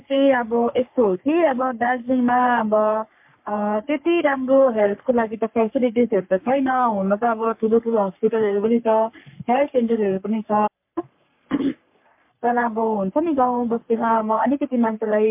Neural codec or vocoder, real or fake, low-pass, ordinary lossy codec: codec, 44.1 kHz, 3.4 kbps, Pupu-Codec; fake; 3.6 kHz; MP3, 32 kbps